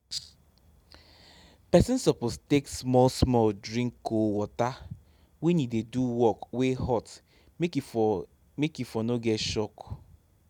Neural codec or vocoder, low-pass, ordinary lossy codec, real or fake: none; 19.8 kHz; none; real